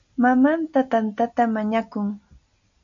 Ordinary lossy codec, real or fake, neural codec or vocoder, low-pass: MP3, 64 kbps; real; none; 7.2 kHz